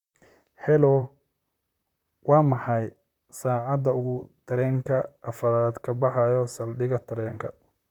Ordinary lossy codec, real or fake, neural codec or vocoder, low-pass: none; fake; vocoder, 44.1 kHz, 128 mel bands, Pupu-Vocoder; 19.8 kHz